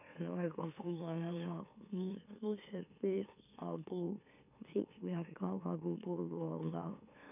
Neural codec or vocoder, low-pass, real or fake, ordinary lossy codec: autoencoder, 44.1 kHz, a latent of 192 numbers a frame, MeloTTS; 3.6 kHz; fake; none